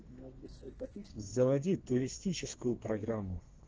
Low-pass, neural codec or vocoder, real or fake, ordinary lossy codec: 7.2 kHz; codec, 32 kHz, 1.9 kbps, SNAC; fake; Opus, 16 kbps